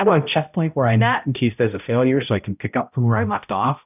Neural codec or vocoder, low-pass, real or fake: codec, 16 kHz, 0.5 kbps, X-Codec, HuBERT features, trained on balanced general audio; 3.6 kHz; fake